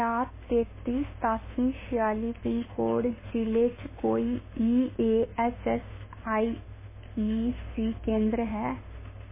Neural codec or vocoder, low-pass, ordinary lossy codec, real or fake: autoencoder, 48 kHz, 32 numbers a frame, DAC-VAE, trained on Japanese speech; 3.6 kHz; MP3, 16 kbps; fake